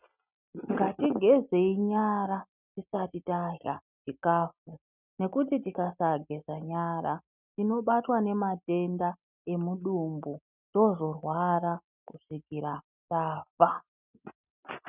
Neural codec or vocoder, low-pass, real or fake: none; 3.6 kHz; real